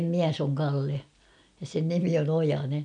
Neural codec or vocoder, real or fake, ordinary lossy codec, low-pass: none; real; none; 9.9 kHz